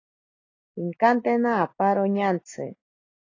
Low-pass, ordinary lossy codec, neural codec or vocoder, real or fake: 7.2 kHz; AAC, 32 kbps; none; real